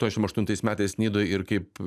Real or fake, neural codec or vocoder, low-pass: real; none; 14.4 kHz